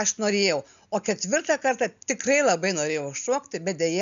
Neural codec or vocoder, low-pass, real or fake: none; 7.2 kHz; real